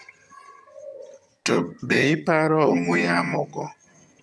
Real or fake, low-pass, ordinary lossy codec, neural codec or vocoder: fake; none; none; vocoder, 22.05 kHz, 80 mel bands, HiFi-GAN